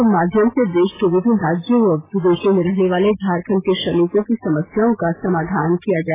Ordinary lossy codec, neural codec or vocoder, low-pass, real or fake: AAC, 16 kbps; none; 3.6 kHz; real